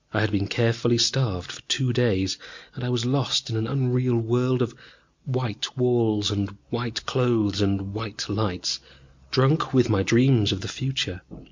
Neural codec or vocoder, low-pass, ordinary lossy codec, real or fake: none; 7.2 kHz; MP3, 48 kbps; real